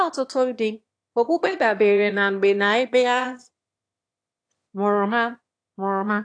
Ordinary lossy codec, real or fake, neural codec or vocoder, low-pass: AAC, 48 kbps; fake; autoencoder, 22.05 kHz, a latent of 192 numbers a frame, VITS, trained on one speaker; 9.9 kHz